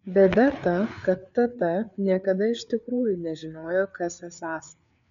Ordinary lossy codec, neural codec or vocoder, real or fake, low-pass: MP3, 96 kbps; codec, 16 kHz, 4 kbps, FreqCodec, larger model; fake; 7.2 kHz